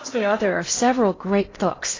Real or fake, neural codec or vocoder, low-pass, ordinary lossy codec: fake; codec, 16 kHz in and 24 kHz out, 0.6 kbps, FocalCodec, streaming, 2048 codes; 7.2 kHz; AAC, 32 kbps